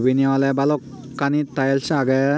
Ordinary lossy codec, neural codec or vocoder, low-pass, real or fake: none; none; none; real